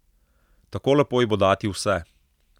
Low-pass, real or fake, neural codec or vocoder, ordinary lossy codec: 19.8 kHz; real; none; none